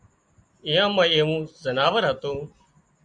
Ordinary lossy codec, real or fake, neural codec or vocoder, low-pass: Opus, 64 kbps; real; none; 9.9 kHz